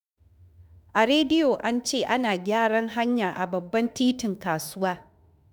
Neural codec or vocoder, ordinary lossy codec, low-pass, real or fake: autoencoder, 48 kHz, 32 numbers a frame, DAC-VAE, trained on Japanese speech; none; none; fake